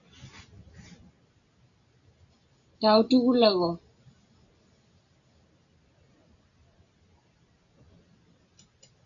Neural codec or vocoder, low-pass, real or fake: none; 7.2 kHz; real